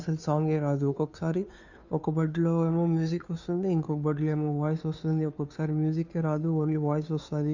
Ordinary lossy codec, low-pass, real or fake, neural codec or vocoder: none; 7.2 kHz; fake; codec, 16 kHz, 2 kbps, FunCodec, trained on Chinese and English, 25 frames a second